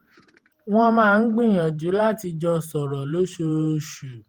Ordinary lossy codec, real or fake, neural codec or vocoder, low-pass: Opus, 24 kbps; fake; vocoder, 48 kHz, 128 mel bands, Vocos; 19.8 kHz